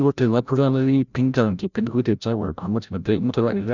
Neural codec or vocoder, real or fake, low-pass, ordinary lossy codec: codec, 16 kHz, 0.5 kbps, FreqCodec, larger model; fake; 7.2 kHz; none